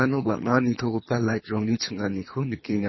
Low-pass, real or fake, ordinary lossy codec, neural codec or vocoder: 7.2 kHz; fake; MP3, 24 kbps; codec, 24 kHz, 3 kbps, HILCodec